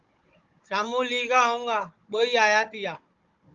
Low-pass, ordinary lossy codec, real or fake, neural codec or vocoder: 7.2 kHz; Opus, 32 kbps; fake; codec, 16 kHz, 16 kbps, FunCodec, trained on Chinese and English, 50 frames a second